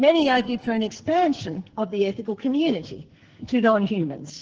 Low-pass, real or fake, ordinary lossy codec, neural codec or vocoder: 7.2 kHz; fake; Opus, 16 kbps; codec, 44.1 kHz, 2.6 kbps, SNAC